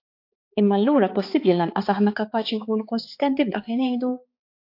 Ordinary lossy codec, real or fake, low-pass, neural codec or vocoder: AAC, 32 kbps; fake; 5.4 kHz; codec, 16 kHz, 4 kbps, X-Codec, HuBERT features, trained on balanced general audio